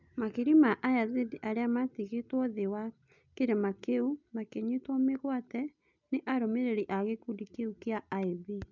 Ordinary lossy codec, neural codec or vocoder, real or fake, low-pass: none; none; real; 7.2 kHz